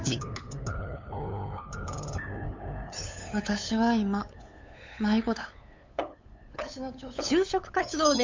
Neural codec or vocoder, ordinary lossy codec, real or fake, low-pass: codec, 16 kHz, 8 kbps, FunCodec, trained on LibriTTS, 25 frames a second; MP3, 64 kbps; fake; 7.2 kHz